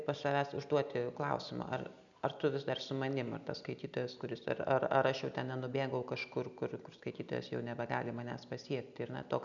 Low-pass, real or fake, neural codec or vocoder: 7.2 kHz; real; none